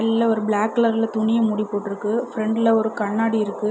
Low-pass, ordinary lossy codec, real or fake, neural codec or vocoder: none; none; real; none